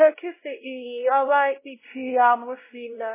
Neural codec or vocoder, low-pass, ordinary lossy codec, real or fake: codec, 16 kHz, 0.5 kbps, X-Codec, WavLM features, trained on Multilingual LibriSpeech; 3.6 kHz; MP3, 16 kbps; fake